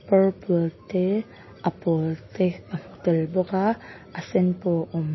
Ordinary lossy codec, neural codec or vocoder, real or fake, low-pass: MP3, 24 kbps; none; real; 7.2 kHz